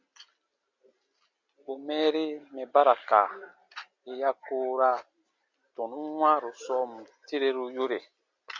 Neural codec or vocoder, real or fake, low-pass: none; real; 7.2 kHz